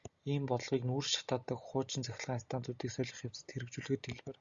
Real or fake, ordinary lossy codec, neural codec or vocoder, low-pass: real; Opus, 64 kbps; none; 7.2 kHz